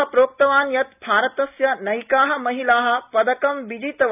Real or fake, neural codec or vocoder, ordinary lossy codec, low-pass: real; none; none; 3.6 kHz